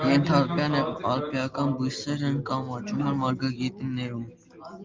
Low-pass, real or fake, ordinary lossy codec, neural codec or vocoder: 7.2 kHz; real; Opus, 32 kbps; none